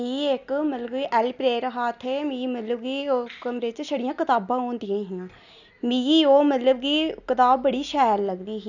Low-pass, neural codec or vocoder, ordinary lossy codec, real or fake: 7.2 kHz; none; none; real